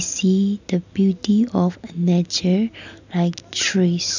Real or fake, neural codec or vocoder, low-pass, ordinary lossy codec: real; none; 7.2 kHz; none